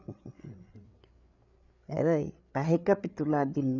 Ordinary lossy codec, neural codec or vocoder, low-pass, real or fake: none; codec, 16 kHz, 8 kbps, FreqCodec, larger model; 7.2 kHz; fake